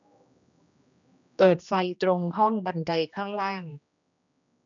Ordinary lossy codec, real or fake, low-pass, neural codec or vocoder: none; fake; 7.2 kHz; codec, 16 kHz, 1 kbps, X-Codec, HuBERT features, trained on general audio